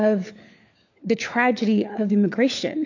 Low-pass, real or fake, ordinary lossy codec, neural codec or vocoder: 7.2 kHz; fake; AAC, 48 kbps; codec, 16 kHz, 4 kbps, FunCodec, trained on LibriTTS, 50 frames a second